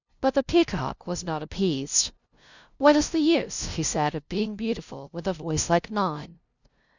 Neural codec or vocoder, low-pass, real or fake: codec, 16 kHz, 0.5 kbps, FunCodec, trained on LibriTTS, 25 frames a second; 7.2 kHz; fake